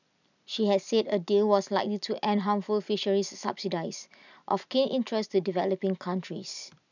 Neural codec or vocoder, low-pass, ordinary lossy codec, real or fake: none; 7.2 kHz; none; real